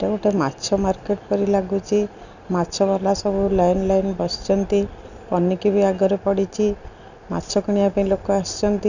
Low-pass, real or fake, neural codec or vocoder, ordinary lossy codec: 7.2 kHz; real; none; none